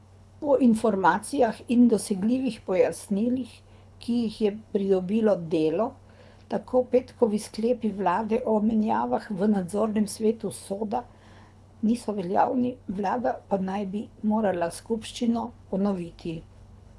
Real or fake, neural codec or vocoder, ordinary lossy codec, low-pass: fake; codec, 24 kHz, 6 kbps, HILCodec; none; none